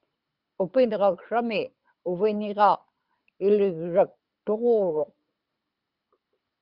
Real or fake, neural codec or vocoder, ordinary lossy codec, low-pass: fake; codec, 24 kHz, 6 kbps, HILCodec; Opus, 64 kbps; 5.4 kHz